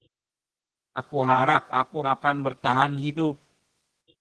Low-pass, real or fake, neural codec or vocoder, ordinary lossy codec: 10.8 kHz; fake; codec, 24 kHz, 0.9 kbps, WavTokenizer, medium music audio release; Opus, 16 kbps